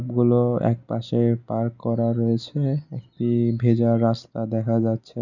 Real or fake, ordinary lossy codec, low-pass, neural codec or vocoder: real; none; 7.2 kHz; none